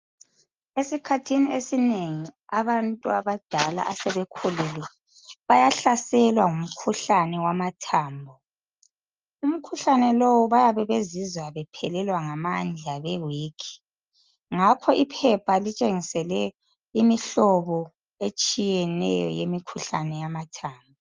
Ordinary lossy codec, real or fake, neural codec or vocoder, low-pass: Opus, 24 kbps; real; none; 7.2 kHz